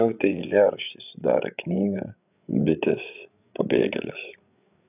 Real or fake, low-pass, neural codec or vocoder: fake; 3.6 kHz; codec, 16 kHz, 8 kbps, FreqCodec, larger model